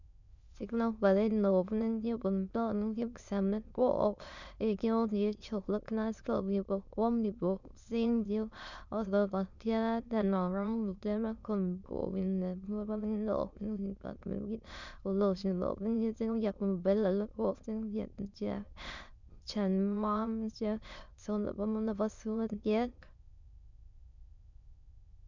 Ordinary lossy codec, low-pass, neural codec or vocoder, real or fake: none; 7.2 kHz; autoencoder, 22.05 kHz, a latent of 192 numbers a frame, VITS, trained on many speakers; fake